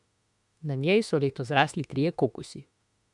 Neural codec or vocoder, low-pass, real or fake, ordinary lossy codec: autoencoder, 48 kHz, 32 numbers a frame, DAC-VAE, trained on Japanese speech; 10.8 kHz; fake; none